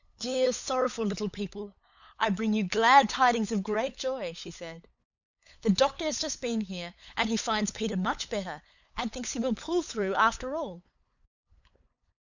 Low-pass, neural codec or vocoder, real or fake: 7.2 kHz; codec, 16 kHz, 8 kbps, FunCodec, trained on LibriTTS, 25 frames a second; fake